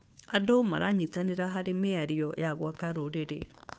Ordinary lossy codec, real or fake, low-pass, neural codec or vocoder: none; fake; none; codec, 16 kHz, 2 kbps, FunCodec, trained on Chinese and English, 25 frames a second